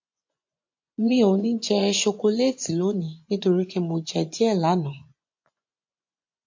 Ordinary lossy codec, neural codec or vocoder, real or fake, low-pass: MP3, 48 kbps; vocoder, 24 kHz, 100 mel bands, Vocos; fake; 7.2 kHz